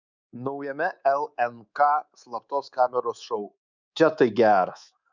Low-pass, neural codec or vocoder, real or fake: 7.2 kHz; codec, 24 kHz, 3.1 kbps, DualCodec; fake